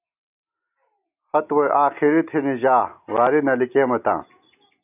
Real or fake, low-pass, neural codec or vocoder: real; 3.6 kHz; none